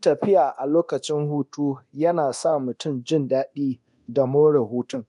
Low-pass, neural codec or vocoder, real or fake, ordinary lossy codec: 10.8 kHz; codec, 24 kHz, 0.9 kbps, DualCodec; fake; none